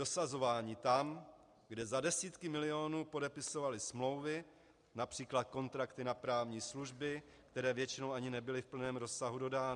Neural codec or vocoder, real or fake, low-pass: none; real; 10.8 kHz